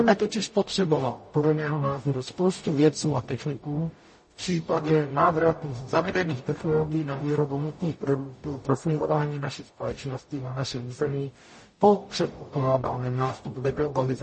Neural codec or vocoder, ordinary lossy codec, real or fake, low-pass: codec, 44.1 kHz, 0.9 kbps, DAC; MP3, 32 kbps; fake; 10.8 kHz